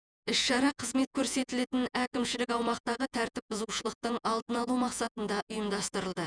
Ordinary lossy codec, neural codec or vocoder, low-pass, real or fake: none; vocoder, 48 kHz, 128 mel bands, Vocos; 9.9 kHz; fake